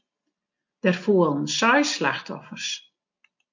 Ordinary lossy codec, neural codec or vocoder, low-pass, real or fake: MP3, 48 kbps; none; 7.2 kHz; real